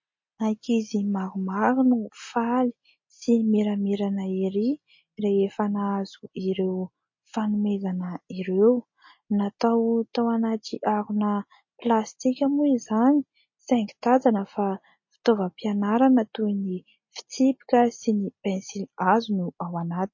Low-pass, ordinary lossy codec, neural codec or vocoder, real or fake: 7.2 kHz; MP3, 32 kbps; none; real